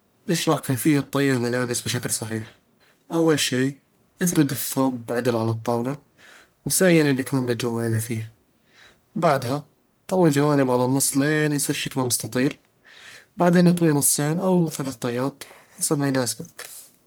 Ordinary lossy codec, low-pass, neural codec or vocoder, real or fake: none; none; codec, 44.1 kHz, 1.7 kbps, Pupu-Codec; fake